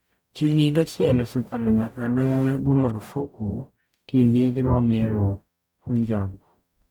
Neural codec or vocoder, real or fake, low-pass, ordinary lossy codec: codec, 44.1 kHz, 0.9 kbps, DAC; fake; 19.8 kHz; none